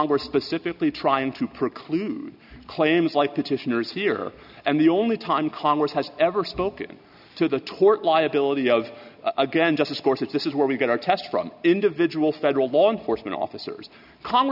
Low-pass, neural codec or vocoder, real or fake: 5.4 kHz; none; real